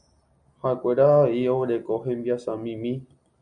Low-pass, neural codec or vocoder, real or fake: 9.9 kHz; none; real